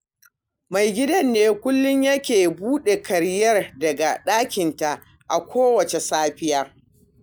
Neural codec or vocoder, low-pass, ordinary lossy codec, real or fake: none; none; none; real